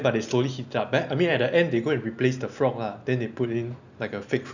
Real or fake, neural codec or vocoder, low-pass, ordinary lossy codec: real; none; 7.2 kHz; none